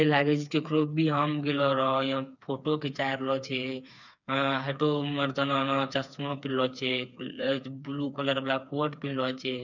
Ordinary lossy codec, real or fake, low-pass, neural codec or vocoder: none; fake; 7.2 kHz; codec, 16 kHz, 4 kbps, FreqCodec, smaller model